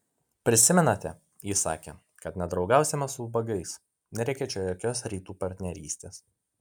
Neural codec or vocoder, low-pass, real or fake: none; 19.8 kHz; real